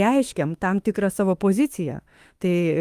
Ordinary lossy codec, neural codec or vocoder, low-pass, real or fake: Opus, 32 kbps; autoencoder, 48 kHz, 32 numbers a frame, DAC-VAE, trained on Japanese speech; 14.4 kHz; fake